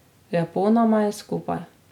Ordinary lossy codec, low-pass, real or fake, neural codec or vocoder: none; 19.8 kHz; real; none